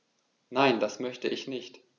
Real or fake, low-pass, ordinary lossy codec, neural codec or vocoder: real; 7.2 kHz; none; none